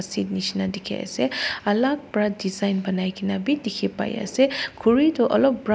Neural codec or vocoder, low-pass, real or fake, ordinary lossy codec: none; none; real; none